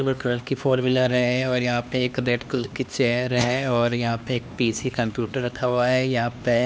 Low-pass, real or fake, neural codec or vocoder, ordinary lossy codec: none; fake; codec, 16 kHz, 2 kbps, X-Codec, HuBERT features, trained on LibriSpeech; none